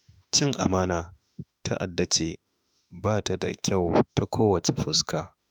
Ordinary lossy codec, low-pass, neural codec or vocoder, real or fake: none; none; autoencoder, 48 kHz, 32 numbers a frame, DAC-VAE, trained on Japanese speech; fake